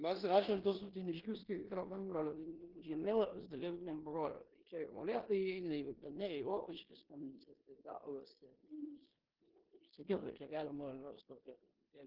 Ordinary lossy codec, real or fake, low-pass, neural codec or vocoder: Opus, 16 kbps; fake; 5.4 kHz; codec, 16 kHz in and 24 kHz out, 0.9 kbps, LongCat-Audio-Codec, four codebook decoder